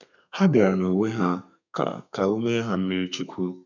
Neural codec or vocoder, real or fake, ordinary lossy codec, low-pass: codec, 32 kHz, 1.9 kbps, SNAC; fake; none; 7.2 kHz